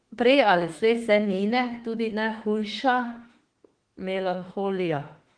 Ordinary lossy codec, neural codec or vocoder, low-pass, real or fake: Opus, 16 kbps; autoencoder, 48 kHz, 32 numbers a frame, DAC-VAE, trained on Japanese speech; 9.9 kHz; fake